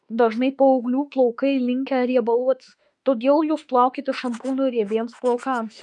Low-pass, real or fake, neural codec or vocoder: 10.8 kHz; fake; autoencoder, 48 kHz, 32 numbers a frame, DAC-VAE, trained on Japanese speech